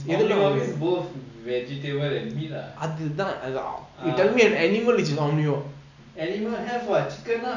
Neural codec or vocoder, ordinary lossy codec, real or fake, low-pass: none; none; real; 7.2 kHz